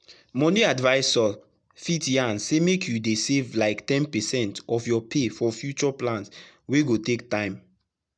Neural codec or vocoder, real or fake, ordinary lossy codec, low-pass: none; real; none; 9.9 kHz